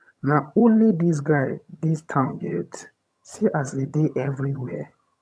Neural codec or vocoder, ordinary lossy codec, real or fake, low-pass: vocoder, 22.05 kHz, 80 mel bands, HiFi-GAN; none; fake; none